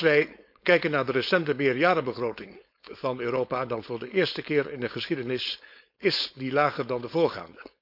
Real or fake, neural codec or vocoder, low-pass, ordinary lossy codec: fake; codec, 16 kHz, 4.8 kbps, FACodec; 5.4 kHz; none